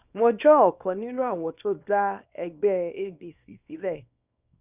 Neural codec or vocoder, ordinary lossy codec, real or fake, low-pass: codec, 24 kHz, 0.9 kbps, WavTokenizer, small release; none; fake; 3.6 kHz